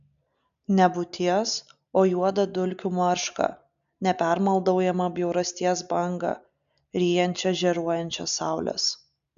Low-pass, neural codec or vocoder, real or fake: 7.2 kHz; none; real